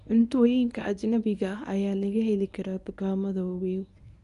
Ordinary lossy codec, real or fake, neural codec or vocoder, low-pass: none; fake; codec, 24 kHz, 0.9 kbps, WavTokenizer, medium speech release version 1; 10.8 kHz